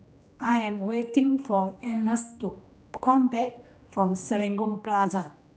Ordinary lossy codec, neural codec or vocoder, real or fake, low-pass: none; codec, 16 kHz, 1 kbps, X-Codec, HuBERT features, trained on general audio; fake; none